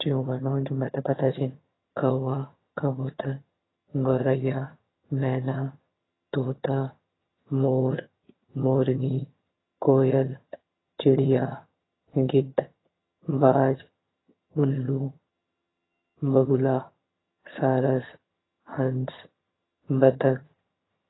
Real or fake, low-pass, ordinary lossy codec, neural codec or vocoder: fake; 7.2 kHz; AAC, 16 kbps; vocoder, 22.05 kHz, 80 mel bands, HiFi-GAN